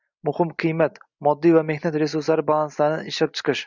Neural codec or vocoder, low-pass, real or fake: none; 7.2 kHz; real